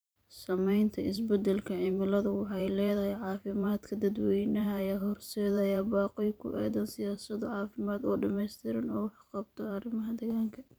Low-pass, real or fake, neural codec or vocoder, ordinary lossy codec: none; fake; vocoder, 44.1 kHz, 128 mel bands every 512 samples, BigVGAN v2; none